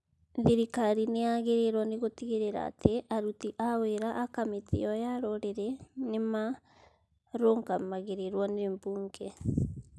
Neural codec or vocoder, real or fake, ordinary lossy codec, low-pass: none; real; none; none